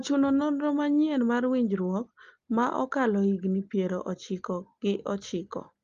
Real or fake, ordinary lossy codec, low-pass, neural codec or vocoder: real; Opus, 32 kbps; 7.2 kHz; none